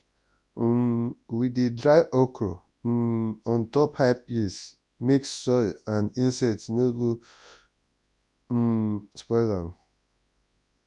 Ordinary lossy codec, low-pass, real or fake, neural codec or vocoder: MP3, 64 kbps; 10.8 kHz; fake; codec, 24 kHz, 0.9 kbps, WavTokenizer, large speech release